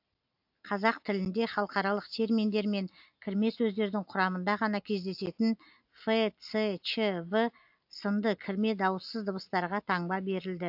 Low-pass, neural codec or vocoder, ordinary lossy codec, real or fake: 5.4 kHz; none; AAC, 48 kbps; real